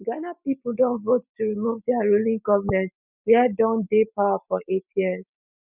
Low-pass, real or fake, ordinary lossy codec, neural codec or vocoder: 3.6 kHz; real; none; none